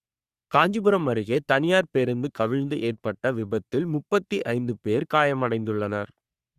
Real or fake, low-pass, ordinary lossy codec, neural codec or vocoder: fake; 14.4 kHz; Opus, 64 kbps; codec, 44.1 kHz, 3.4 kbps, Pupu-Codec